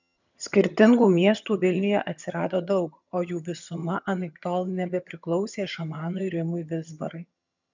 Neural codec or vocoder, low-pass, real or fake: vocoder, 22.05 kHz, 80 mel bands, HiFi-GAN; 7.2 kHz; fake